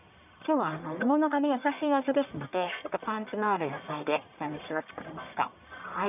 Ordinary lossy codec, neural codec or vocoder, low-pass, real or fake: none; codec, 44.1 kHz, 1.7 kbps, Pupu-Codec; 3.6 kHz; fake